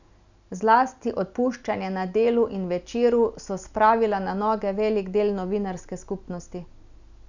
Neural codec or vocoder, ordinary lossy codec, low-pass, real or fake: none; none; 7.2 kHz; real